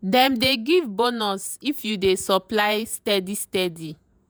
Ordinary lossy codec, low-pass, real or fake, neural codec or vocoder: none; none; fake; vocoder, 48 kHz, 128 mel bands, Vocos